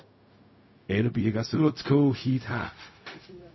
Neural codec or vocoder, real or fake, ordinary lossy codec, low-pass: codec, 16 kHz, 0.4 kbps, LongCat-Audio-Codec; fake; MP3, 24 kbps; 7.2 kHz